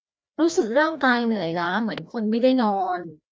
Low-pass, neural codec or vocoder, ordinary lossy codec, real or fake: none; codec, 16 kHz, 1 kbps, FreqCodec, larger model; none; fake